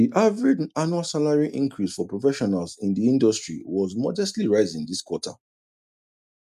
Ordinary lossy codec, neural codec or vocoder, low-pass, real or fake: none; none; 14.4 kHz; real